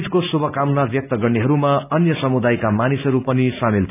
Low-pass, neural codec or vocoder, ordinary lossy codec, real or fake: 3.6 kHz; none; none; real